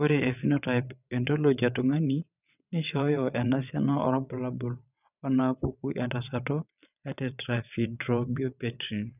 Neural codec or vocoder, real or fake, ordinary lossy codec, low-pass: vocoder, 22.05 kHz, 80 mel bands, WaveNeXt; fake; none; 3.6 kHz